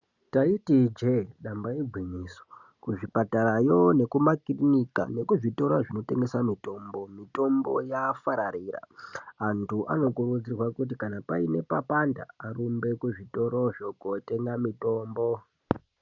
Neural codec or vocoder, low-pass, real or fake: none; 7.2 kHz; real